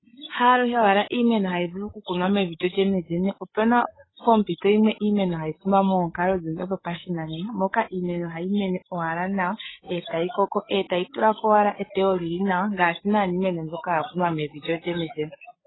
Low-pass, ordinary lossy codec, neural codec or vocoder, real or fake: 7.2 kHz; AAC, 16 kbps; codec, 16 kHz, 8 kbps, FreqCodec, larger model; fake